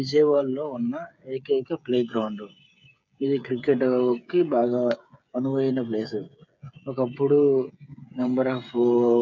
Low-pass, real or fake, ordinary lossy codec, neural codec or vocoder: 7.2 kHz; fake; none; codec, 16 kHz, 8 kbps, FreqCodec, smaller model